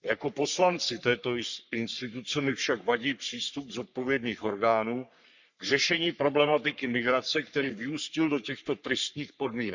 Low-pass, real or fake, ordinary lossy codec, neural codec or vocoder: 7.2 kHz; fake; Opus, 64 kbps; codec, 44.1 kHz, 3.4 kbps, Pupu-Codec